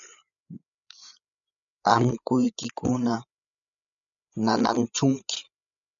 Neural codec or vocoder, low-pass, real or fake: codec, 16 kHz, 8 kbps, FreqCodec, larger model; 7.2 kHz; fake